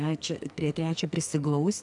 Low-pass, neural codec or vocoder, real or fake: 10.8 kHz; codec, 44.1 kHz, 2.6 kbps, SNAC; fake